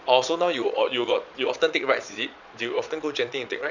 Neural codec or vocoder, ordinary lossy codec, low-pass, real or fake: vocoder, 22.05 kHz, 80 mel bands, Vocos; none; 7.2 kHz; fake